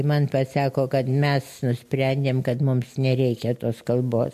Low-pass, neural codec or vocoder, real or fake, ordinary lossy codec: 14.4 kHz; none; real; MP3, 64 kbps